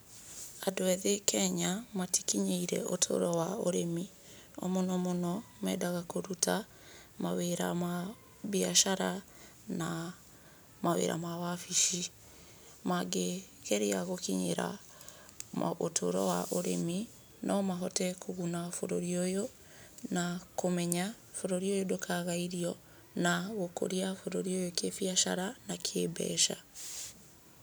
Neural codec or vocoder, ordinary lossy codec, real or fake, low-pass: none; none; real; none